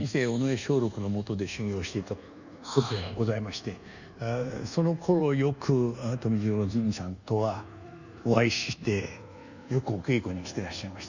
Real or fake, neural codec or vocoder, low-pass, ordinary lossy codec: fake; codec, 24 kHz, 1.2 kbps, DualCodec; 7.2 kHz; none